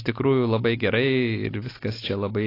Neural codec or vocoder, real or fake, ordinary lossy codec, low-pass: none; real; AAC, 24 kbps; 5.4 kHz